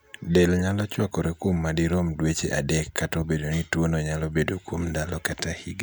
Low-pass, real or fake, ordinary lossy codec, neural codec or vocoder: none; real; none; none